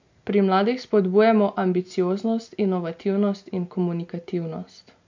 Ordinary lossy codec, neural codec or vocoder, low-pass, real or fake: MP3, 64 kbps; none; 7.2 kHz; real